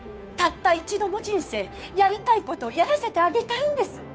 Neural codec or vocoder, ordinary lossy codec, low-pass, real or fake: codec, 16 kHz, 2 kbps, FunCodec, trained on Chinese and English, 25 frames a second; none; none; fake